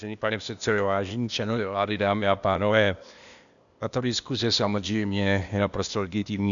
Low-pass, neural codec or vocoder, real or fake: 7.2 kHz; codec, 16 kHz, 0.8 kbps, ZipCodec; fake